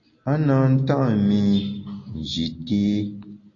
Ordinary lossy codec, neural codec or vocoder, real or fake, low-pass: AAC, 32 kbps; none; real; 7.2 kHz